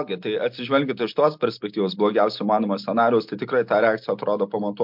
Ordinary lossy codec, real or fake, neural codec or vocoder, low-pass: MP3, 48 kbps; real; none; 5.4 kHz